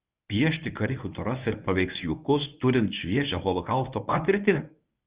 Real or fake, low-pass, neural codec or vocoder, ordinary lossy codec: fake; 3.6 kHz; codec, 24 kHz, 0.9 kbps, WavTokenizer, medium speech release version 1; Opus, 64 kbps